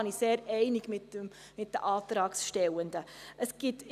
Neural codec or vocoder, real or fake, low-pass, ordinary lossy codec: none; real; 14.4 kHz; none